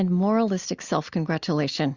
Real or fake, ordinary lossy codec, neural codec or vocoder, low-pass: real; Opus, 64 kbps; none; 7.2 kHz